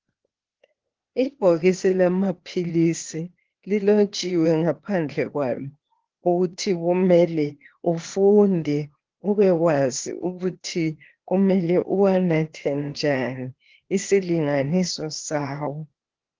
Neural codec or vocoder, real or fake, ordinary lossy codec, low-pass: codec, 16 kHz, 0.8 kbps, ZipCodec; fake; Opus, 16 kbps; 7.2 kHz